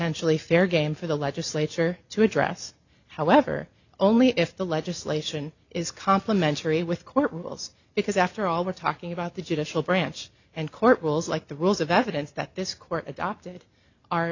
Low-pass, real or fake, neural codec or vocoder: 7.2 kHz; real; none